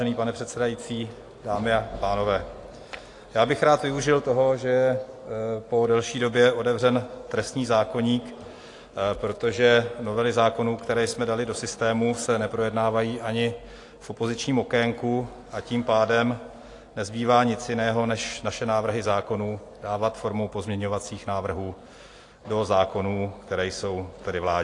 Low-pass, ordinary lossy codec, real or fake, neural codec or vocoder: 10.8 kHz; AAC, 48 kbps; real; none